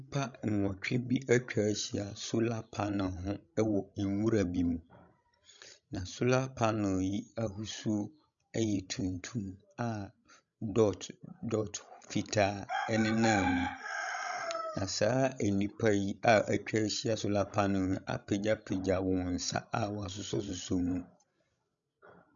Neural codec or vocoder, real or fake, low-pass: codec, 16 kHz, 16 kbps, FreqCodec, larger model; fake; 7.2 kHz